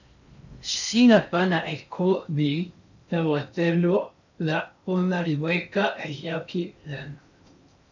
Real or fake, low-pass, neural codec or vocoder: fake; 7.2 kHz; codec, 16 kHz in and 24 kHz out, 0.6 kbps, FocalCodec, streaming, 4096 codes